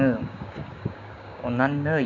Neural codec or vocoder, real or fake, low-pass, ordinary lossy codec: codec, 16 kHz, 6 kbps, DAC; fake; 7.2 kHz; none